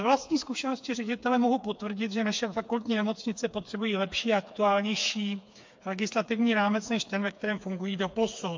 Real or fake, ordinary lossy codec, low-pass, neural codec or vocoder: fake; MP3, 48 kbps; 7.2 kHz; codec, 16 kHz, 4 kbps, FreqCodec, smaller model